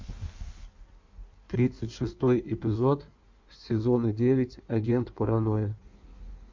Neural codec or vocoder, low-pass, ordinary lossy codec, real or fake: codec, 16 kHz in and 24 kHz out, 1.1 kbps, FireRedTTS-2 codec; 7.2 kHz; MP3, 48 kbps; fake